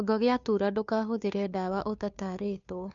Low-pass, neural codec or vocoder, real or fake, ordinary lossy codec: 7.2 kHz; codec, 16 kHz, 2 kbps, FunCodec, trained on Chinese and English, 25 frames a second; fake; none